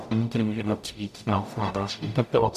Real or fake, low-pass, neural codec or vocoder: fake; 14.4 kHz; codec, 44.1 kHz, 0.9 kbps, DAC